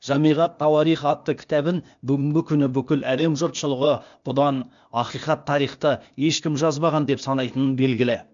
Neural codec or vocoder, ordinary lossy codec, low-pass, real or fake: codec, 16 kHz, 0.8 kbps, ZipCodec; MP3, 64 kbps; 7.2 kHz; fake